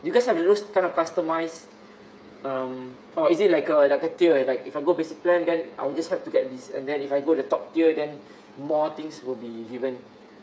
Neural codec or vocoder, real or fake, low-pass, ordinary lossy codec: codec, 16 kHz, 8 kbps, FreqCodec, smaller model; fake; none; none